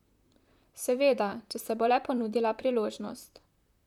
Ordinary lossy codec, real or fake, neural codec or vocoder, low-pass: none; fake; vocoder, 44.1 kHz, 128 mel bands, Pupu-Vocoder; 19.8 kHz